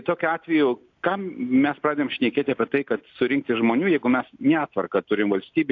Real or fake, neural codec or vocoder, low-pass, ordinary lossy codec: real; none; 7.2 kHz; AAC, 48 kbps